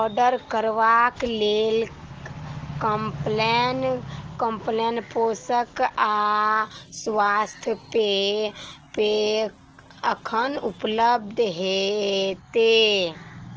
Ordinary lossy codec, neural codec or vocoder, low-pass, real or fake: Opus, 16 kbps; none; 7.2 kHz; real